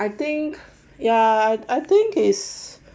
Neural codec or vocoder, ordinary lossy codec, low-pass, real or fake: none; none; none; real